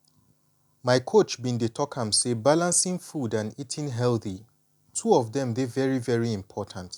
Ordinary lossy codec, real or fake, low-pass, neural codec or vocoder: none; real; 19.8 kHz; none